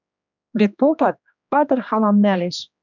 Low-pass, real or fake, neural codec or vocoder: 7.2 kHz; fake; codec, 16 kHz, 2 kbps, X-Codec, HuBERT features, trained on general audio